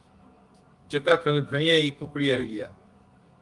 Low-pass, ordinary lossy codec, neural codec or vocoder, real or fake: 10.8 kHz; Opus, 32 kbps; codec, 24 kHz, 0.9 kbps, WavTokenizer, medium music audio release; fake